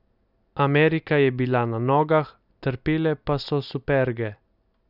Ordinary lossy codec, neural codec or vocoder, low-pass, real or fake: none; none; 5.4 kHz; real